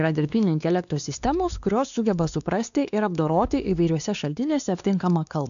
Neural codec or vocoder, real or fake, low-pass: codec, 16 kHz, 2 kbps, X-Codec, WavLM features, trained on Multilingual LibriSpeech; fake; 7.2 kHz